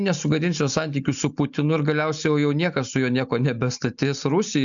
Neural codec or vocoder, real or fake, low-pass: none; real; 7.2 kHz